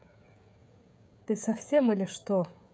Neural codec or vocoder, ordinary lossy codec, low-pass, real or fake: codec, 16 kHz, 16 kbps, FreqCodec, smaller model; none; none; fake